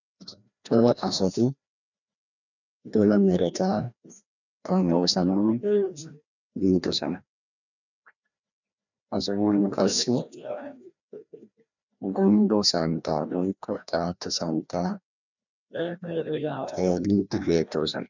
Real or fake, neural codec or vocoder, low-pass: fake; codec, 16 kHz, 1 kbps, FreqCodec, larger model; 7.2 kHz